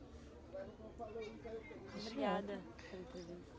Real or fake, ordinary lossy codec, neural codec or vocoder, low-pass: real; none; none; none